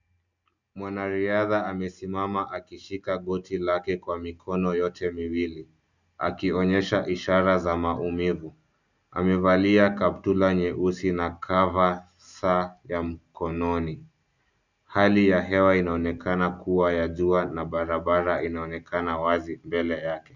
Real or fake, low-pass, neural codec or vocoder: real; 7.2 kHz; none